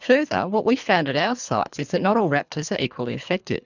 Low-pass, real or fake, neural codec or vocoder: 7.2 kHz; fake; codec, 24 kHz, 3 kbps, HILCodec